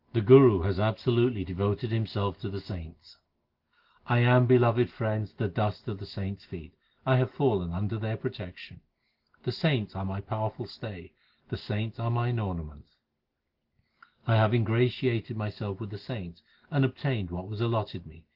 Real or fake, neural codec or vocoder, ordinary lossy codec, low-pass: real; none; Opus, 16 kbps; 5.4 kHz